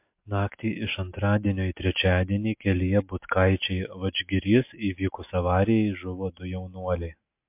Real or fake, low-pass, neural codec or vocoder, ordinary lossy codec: real; 3.6 kHz; none; MP3, 32 kbps